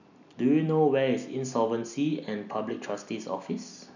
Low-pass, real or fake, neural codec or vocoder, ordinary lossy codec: 7.2 kHz; real; none; none